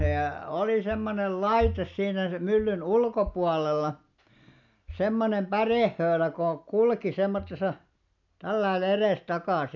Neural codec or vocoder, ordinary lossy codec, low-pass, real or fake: none; none; 7.2 kHz; real